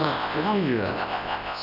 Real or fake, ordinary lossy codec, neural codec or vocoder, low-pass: fake; none; codec, 24 kHz, 0.9 kbps, WavTokenizer, large speech release; 5.4 kHz